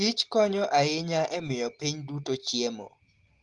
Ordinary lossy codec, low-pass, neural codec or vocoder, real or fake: Opus, 24 kbps; 10.8 kHz; none; real